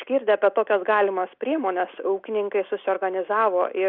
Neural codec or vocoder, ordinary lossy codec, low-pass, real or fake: none; Opus, 64 kbps; 5.4 kHz; real